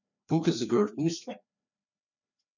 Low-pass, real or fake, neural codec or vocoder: 7.2 kHz; fake; codec, 16 kHz, 2 kbps, FreqCodec, larger model